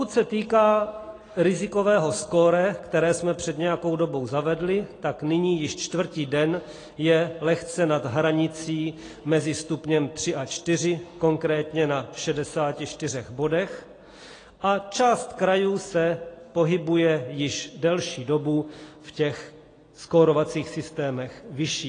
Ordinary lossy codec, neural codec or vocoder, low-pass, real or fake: AAC, 32 kbps; none; 9.9 kHz; real